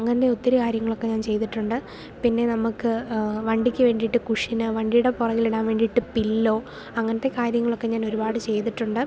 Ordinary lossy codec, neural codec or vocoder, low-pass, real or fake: none; none; none; real